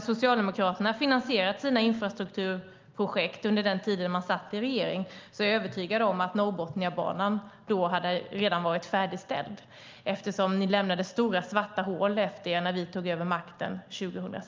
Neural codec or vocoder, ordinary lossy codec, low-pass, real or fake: none; Opus, 32 kbps; 7.2 kHz; real